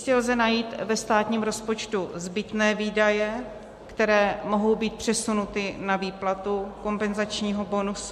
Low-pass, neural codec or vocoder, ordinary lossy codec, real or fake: 14.4 kHz; none; MP3, 64 kbps; real